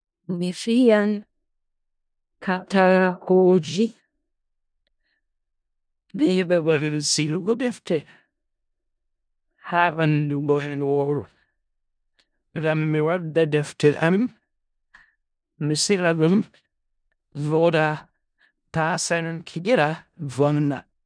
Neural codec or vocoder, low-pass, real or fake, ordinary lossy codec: codec, 16 kHz in and 24 kHz out, 0.4 kbps, LongCat-Audio-Codec, four codebook decoder; 9.9 kHz; fake; none